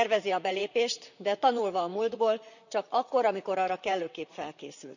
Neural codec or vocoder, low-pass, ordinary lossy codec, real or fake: vocoder, 44.1 kHz, 128 mel bands, Pupu-Vocoder; 7.2 kHz; none; fake